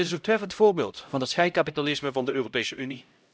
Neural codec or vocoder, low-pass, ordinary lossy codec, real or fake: codec, 16 kHz, 0.5 kbps, X-Codec, HuBERT features, trained on LibriSpeech; none; none; fake